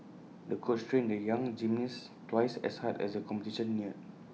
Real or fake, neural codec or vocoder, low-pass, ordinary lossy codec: real; none; none; none